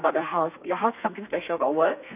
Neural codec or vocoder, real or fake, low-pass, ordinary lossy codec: codec, 32 kHz, 1.9 kbps, SNAC; fake; 3.6 kHz; none